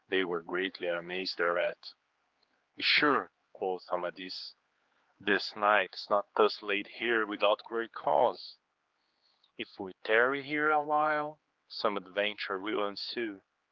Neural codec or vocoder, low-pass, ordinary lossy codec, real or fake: codec, 16 kHz, 4 kbps, X-Codec, HuBERT features, trained on general audio; 7.2 kHz; Opus, 32 kbps; fake